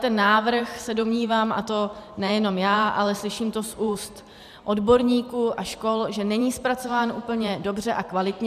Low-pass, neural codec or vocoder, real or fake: 14.4 kHz; vocoder, 44.1 kHz, 128 mel bands every 512 samples, BigVGAN v2; fake